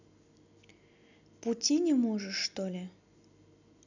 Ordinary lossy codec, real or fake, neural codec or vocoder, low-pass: none; real; none; 7.2 kHz